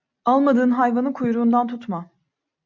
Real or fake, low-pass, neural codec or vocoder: real; 7.2 kHz; none